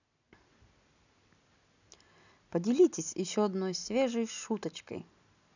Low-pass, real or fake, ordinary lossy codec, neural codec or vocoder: 7.2 kHz; real; none; none